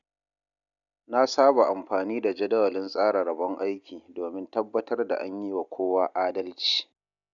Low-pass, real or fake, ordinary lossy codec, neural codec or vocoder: 7.2 kHz; real; none; none